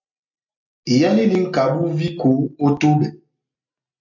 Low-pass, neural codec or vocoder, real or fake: 7.2 kHz; none; real